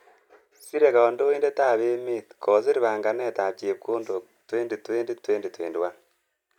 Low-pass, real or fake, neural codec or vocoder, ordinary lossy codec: 19.8 kHz; real; none; none